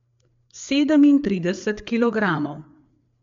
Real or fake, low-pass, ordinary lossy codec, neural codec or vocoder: fake; 7.2 kHz; MP3, 64 kbps; codec, 16 kHz, 4 kbps, FreqCodec, larger model